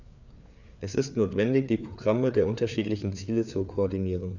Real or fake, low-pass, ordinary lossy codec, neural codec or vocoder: fake; 7.2 kHz; none; codec, 16 kHz, 4 kbps, FreqCodec, larger model